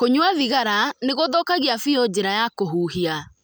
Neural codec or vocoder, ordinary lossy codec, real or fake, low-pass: none; none; real; none